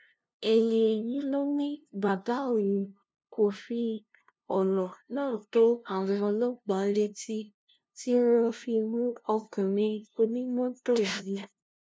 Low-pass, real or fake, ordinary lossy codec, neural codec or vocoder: none; fake; none; codec, 16 kHz, 0.5 kbps, FunCodec, trained on LibriTTS, 25 frames a second